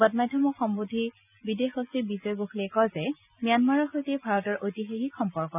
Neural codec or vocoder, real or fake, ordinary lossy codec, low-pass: none; real; none; 3.6 kHz